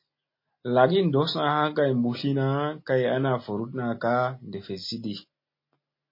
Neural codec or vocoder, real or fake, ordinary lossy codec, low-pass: none; real; MP3, 24 kbps; 5.4 kHz